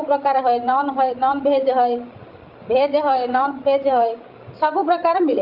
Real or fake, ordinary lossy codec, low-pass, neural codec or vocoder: fake; Opus, 24 kbps; 5.4 kHz; codec, 16 kHz, 16 kbps, FreqCodec, larger model